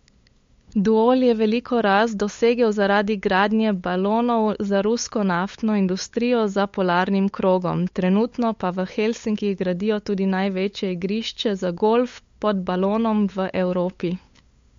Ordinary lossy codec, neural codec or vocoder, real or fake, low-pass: MP3, 48 kbps; codec, 16 kHz, 8 kbps, FunCodec, trained on LibriTTS, 25 frames a second; fake; 7.2 kHz